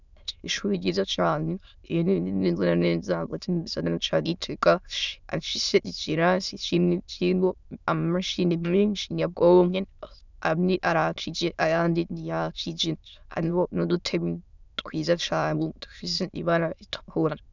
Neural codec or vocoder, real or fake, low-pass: autoencoder, 22.05 kHz, a latent of 192 numbers a frame, VITS, trained on many speakers; fake; 7.2 kHz